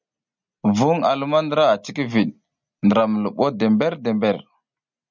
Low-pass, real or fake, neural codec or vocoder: 7.2 kHz; real; none